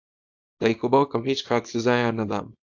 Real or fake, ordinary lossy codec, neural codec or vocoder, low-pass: fake; AAC, 48 kbps; codec, 24 kHz, 0.9 kbps, WavTokenizer, small release; 7.2 kHz